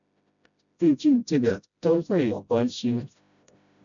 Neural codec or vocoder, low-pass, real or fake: codec, 16 kHz, 0.5 kbps, FreqCodec, smaller model; 7.2 kHz; fake